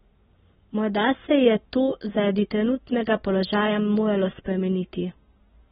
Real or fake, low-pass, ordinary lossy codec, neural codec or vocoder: real; 10.8 kHz; AAC, 16 kbps; none